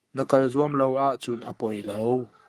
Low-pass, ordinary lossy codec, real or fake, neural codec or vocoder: 14.4 kHz; Opus, 32 kbps; fake; codec, 44.1 kHz, 3.4 kbps, Pupu-Codec